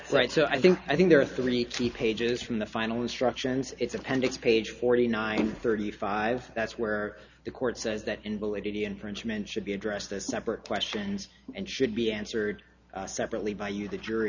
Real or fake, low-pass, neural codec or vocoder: real; 7.2 kHz; none